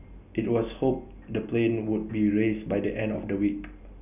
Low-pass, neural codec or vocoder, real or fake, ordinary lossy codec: 3.6 kHz; none; real; none